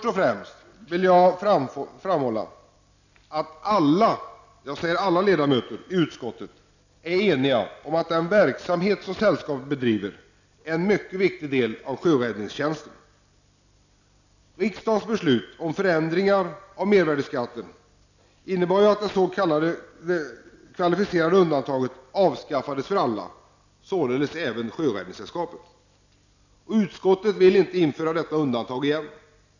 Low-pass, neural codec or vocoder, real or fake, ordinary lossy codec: 7.2 kHz; none; real; none